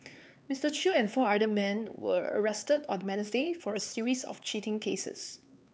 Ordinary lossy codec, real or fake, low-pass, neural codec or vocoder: none; fake; none; codec, 16 kHz, 4 kbps, X-Codec, HuBERT features, trained on LibriSpeech